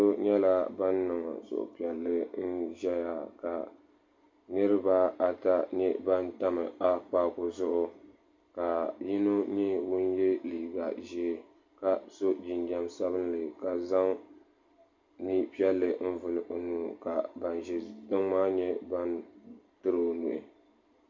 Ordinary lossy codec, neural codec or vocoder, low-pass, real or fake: MP3, 48 kbps; none; 7.2 kHz; real